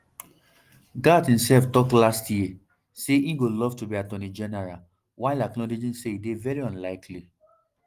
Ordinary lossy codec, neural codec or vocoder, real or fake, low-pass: Opus, 24 kbps; none; real; 14.4 kHz